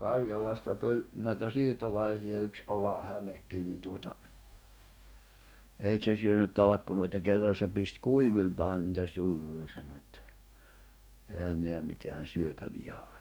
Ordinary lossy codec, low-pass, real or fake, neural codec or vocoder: none; none; fake; codec, 44.1 kHz, 2.6 kbps, DAC